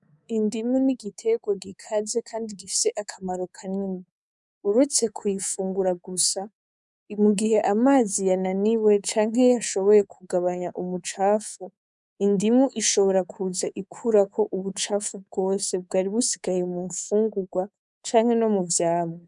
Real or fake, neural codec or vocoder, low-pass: fake; codec, 24 kHz, 3.1 kbps, DualCodec; 10.8 kHz